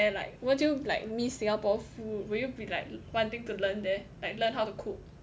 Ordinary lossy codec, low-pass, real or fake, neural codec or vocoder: none; none; real; none